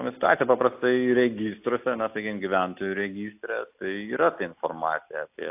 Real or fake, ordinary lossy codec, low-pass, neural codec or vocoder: real; AAC, 32 kbps; 3.6 kHz; none